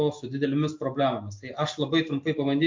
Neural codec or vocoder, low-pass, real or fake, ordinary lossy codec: none; 7.2 kHz; real; MP3, 48 kbps